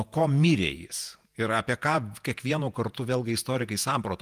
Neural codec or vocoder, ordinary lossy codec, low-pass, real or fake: vocoder, 48 kHz, 128 mel bands, Vocos; Opus, 32 kbps; 14.4 kHz; fake